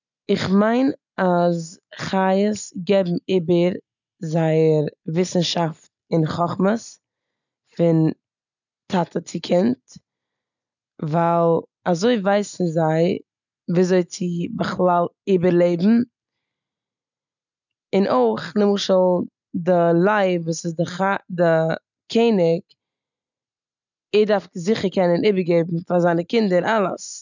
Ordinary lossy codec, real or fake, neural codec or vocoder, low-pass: none; real; none; 7.2 kHz